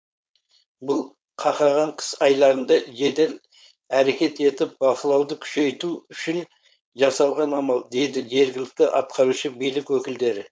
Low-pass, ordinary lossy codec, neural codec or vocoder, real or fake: none; none; codec, 16 kHz, 4.8 kbps, FACodec; fake